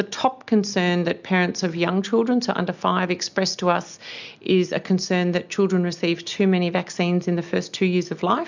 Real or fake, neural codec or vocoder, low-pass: real; none; 7.2 kHz